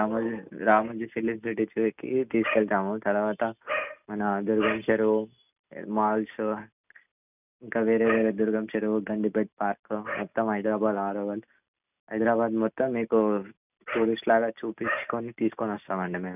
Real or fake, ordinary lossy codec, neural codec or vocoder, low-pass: real; none; none; 3.6 kHz